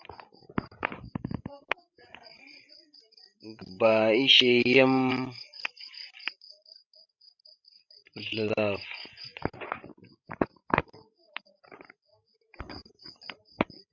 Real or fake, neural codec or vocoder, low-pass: fake; codec, 16 kHz, 16 kbps, FreqCodec, larger model; 7.2 kHz